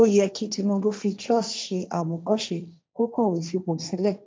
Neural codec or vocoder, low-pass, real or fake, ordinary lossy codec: codec, 16 kHz, 1.1 kbps, Voila-Tokenizer; none; fake; none